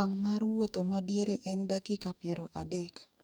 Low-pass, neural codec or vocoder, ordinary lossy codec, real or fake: none; codec, 44.1 kHz, 2.6 kbps, DAC; none; fake